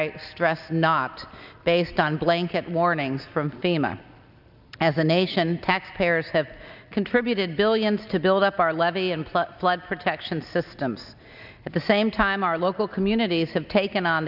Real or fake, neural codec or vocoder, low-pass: real; none; 5.4 kHz